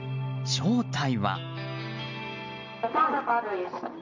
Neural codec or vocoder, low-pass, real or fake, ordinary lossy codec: none; 7.2 kHz; real; MP3, 64 kbps